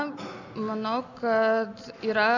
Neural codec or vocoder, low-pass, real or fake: none; 7.2 kHz; real